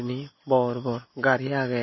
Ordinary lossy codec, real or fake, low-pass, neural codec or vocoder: MP3, 24 kbps; real; 7.2 kHz; none